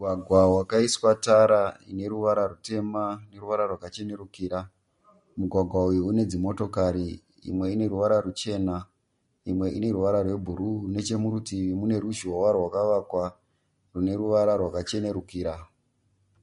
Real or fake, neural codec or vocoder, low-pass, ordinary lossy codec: real; none; 10.8 kHz; MP3, 48 kbps